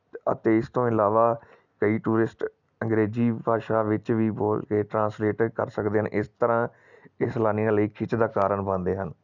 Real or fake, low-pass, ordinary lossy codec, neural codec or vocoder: real; 7.2 kHz; none; none